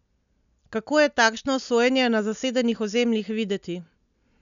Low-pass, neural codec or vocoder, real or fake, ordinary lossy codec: 7.2 kHz; none; real; none